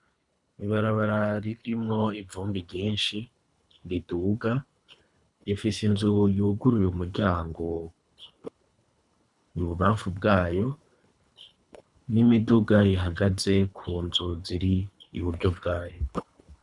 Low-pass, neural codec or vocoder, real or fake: 10.8 kHz; codec, 24 kHz, 3 kbps, HILCodec; fake